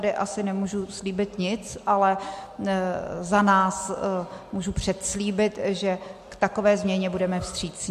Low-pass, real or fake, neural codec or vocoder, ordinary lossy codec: 14.4 kHz; real; none; MP3, 64 kbps